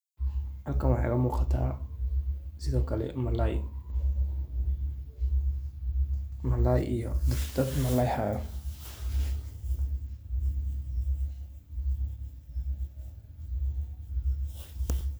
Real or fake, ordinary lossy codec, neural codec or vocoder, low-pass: real; none; none; none